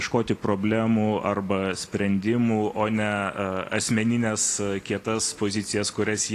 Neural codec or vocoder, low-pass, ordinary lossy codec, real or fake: none; 14.4 kHz; AAC, 48 kbps; real